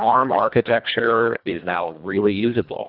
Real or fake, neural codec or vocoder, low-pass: fake; codec, 24 kHz, 1.5 kbps, HILCodec; 5.4 kHz